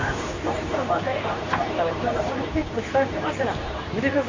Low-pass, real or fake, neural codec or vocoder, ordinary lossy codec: 7.2 kHz; fake; codec, 24 kHz, 0.9 kbps, WavTokenizer, medium speech release version 2; AAC, 32 kbps